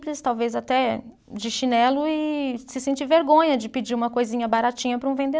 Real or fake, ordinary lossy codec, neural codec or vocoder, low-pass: real; none; none; none